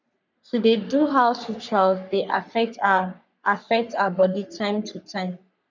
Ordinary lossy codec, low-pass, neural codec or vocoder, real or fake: none; 7.2 kHz; codec, 44.1 kHz, 3.4 kbps, Pupu-Codec; fake